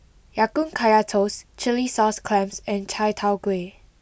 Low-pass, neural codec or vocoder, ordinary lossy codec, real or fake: none; none; none; real